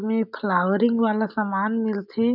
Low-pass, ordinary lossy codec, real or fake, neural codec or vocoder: 5.4 kHz; none; real; none